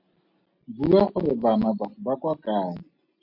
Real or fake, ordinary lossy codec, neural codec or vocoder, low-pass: real; MP3, 32 kbps; none; 5.4 kHz